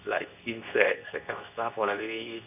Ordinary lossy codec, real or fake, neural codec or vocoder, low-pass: none; fake; codec, 24 kHz, 0.9 kbps, WavTokenizer, medium speech release version 2; 3.6 kHz